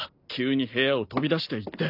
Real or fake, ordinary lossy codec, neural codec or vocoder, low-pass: real; none; none; 5.4 kHz